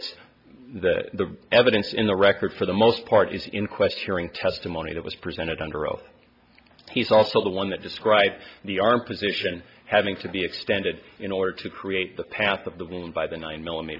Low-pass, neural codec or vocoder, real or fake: 5.4 kHz; none; real